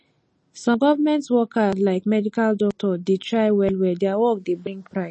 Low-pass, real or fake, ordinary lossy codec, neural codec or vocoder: 10.8 kHz; real; MP3, 32 kbps; none